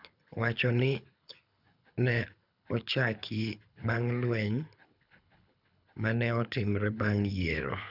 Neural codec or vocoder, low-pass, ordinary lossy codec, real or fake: codec, 24 kHz, 6 kbps, HILCodec; 5.4 kHz; none; fake